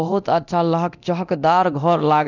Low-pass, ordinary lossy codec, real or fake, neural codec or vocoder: 7.2 kHz; none; fake; codec, 24 kHz, 0.9 kbps, DualCodec